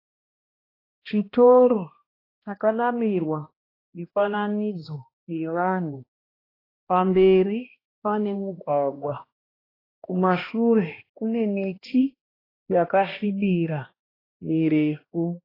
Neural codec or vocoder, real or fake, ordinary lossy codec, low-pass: codec, 16 kHz, 1 kbps, X-Codec, HuBERT features, trained on balanced general audio; fake; AAC, 24 kbps; 5.4 kHz